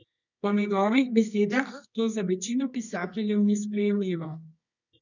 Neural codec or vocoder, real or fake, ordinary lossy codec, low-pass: codec, 24 kHz, 0.9 kbps, WavTokenizer, medium music audio release; fake; none; 7.2 kHz